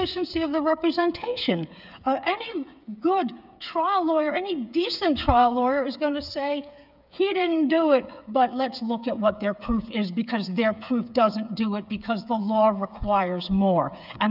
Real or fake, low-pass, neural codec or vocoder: fake; 5.4 kHz; codec, 16 kHz, 8 kbps, FreqCodec, smaller model